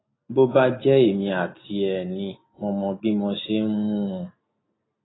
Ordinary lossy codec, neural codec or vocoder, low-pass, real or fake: AAC, 16 kbps; none; 7.2 kHz; real